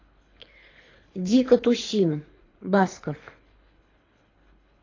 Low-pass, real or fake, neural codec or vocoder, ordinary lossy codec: 7.2 kHz; fake; codec, 24 kHz, 3 kbps, HILCodec; AAC, 32 kbps